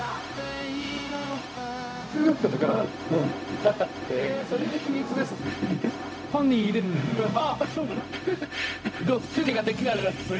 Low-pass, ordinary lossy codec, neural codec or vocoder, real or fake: none; none; codec, 16 kHz, 0.4 kbps, LongCat-Audio-Codec; fake